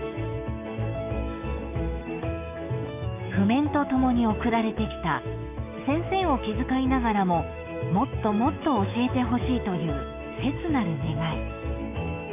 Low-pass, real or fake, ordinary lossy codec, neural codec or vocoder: 3.6 kHz; fake; none; autoencoder, 48 kHz, 128 numbers a frame, DAC-VAE, trained on Japanese speech